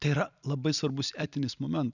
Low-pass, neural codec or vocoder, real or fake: 7.2 kHz; vocoder, 44.1 kHz, 128 mel bands every 256 samples, BigVGAN v2; fake